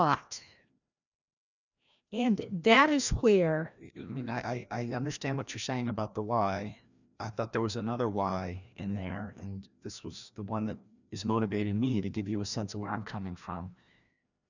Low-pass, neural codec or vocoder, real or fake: 7.2 kHz; codec, 16 kHz, 1 kbps, FreqCodec, larger model; fake